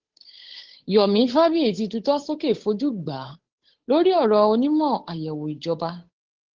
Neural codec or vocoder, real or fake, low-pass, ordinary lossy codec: codec, 16 kHz, 8 kbps, FunCodec, trained on Chinese and English, 25 frames a second; fake; 7.2 kHz; Opus, 16 kbps